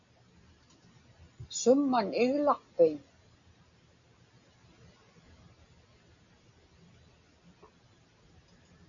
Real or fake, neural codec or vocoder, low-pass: real; none; 7.2 kHz